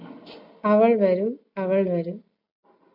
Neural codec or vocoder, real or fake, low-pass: none; real; 5.4 kHz